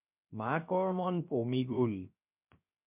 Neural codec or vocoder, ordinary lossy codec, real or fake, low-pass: codec, 16 kHz, 0.3 kbps, FocalCodec; MP3, 32 kbps; fake; 3.6 kHz